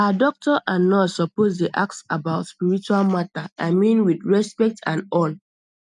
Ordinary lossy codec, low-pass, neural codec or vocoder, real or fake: none; 10.8 kHz; none; real